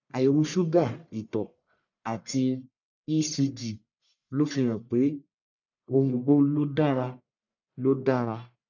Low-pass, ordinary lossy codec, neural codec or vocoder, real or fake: 7.2 kHz; none; codec, 44.1 kHz, 1.7 kbps, Pupu-Codec; fake